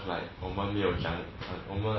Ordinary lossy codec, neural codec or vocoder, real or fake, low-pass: MP3, 24 kbps; none; real; 7.2 kHz